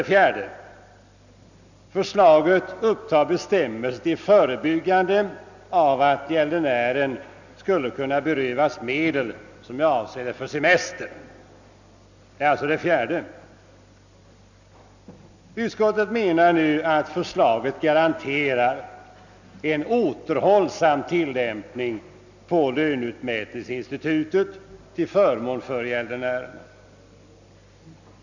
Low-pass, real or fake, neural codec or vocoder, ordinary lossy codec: 7.2 kHz; real; none; none